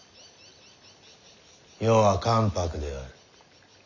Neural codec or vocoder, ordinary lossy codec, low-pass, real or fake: none; none; 7.2 kHz; real